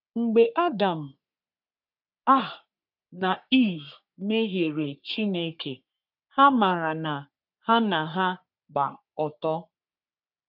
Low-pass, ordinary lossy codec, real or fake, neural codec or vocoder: 5.4 kHz; none; fake; codec, 44.1 kHz, 3.4 kbps, Pupu-Codec